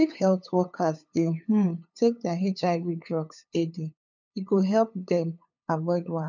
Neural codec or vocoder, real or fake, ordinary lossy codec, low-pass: codec, 16 kHz, 8 kbps, FunCodec, trained on LibriTTS, 25 frames a second; fake; none; 7.2 kHz